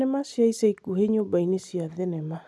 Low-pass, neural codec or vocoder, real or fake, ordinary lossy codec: none; none; real; none